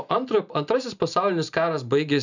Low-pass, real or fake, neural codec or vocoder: 7.2 kHz; real; none